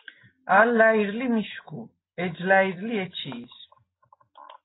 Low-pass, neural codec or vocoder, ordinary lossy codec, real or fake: 7.2 kHz; none; AAC, 16 kbps; real